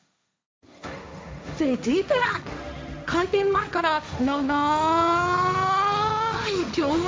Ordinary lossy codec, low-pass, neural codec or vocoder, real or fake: none; none; codec, 16 kHz, 1.1 kbps, Voila-Tokenizer; fake